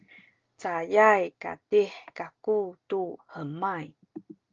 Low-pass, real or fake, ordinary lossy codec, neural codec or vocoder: 7.2 kHz; real; Opus, 24 kbps; none